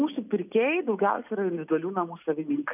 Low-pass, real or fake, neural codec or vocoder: 3.6 kHz; real; none